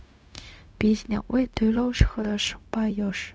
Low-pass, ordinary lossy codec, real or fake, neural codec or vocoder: none; none; fake; codec, 16 kHz, 0.4 kbps, LongCat-Audio-Codec